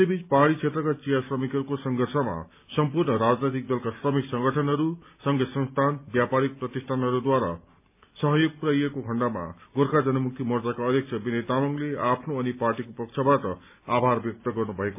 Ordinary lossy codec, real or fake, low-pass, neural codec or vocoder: MP3, 32 kbps; real; 3.6 kHz; none